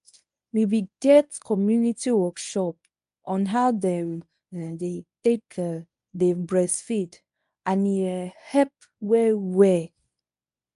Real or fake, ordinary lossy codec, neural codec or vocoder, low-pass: fake; AAC, 96 kbps; codec, 24 kHz, 0.9 kbps, WavTokenizer, medium speech release version 1; 10.8 kHz